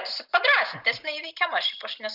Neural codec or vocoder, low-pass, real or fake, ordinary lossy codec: none; 5.4 kHz; real; Opus, 64 kbps